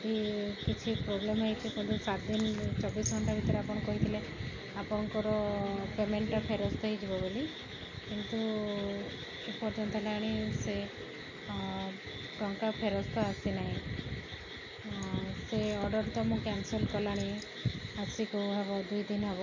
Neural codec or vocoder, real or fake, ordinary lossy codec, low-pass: none; real; MP3, 64 kbps; 7.2 kHz